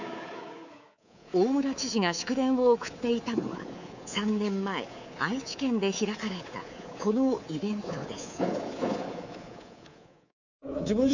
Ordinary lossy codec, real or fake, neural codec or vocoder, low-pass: none; fake; codec, 24 kHz, 3.1 kbps, DualCodec; 7.2 kHz